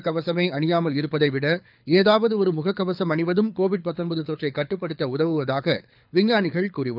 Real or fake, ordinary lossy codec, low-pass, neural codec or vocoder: fake; none; 5.4 kHz; codec, 24 kHz, 6 kbps, HILCodec